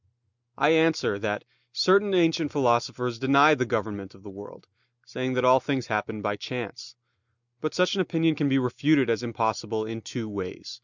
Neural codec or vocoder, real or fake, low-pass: none; real; 7.2 kHz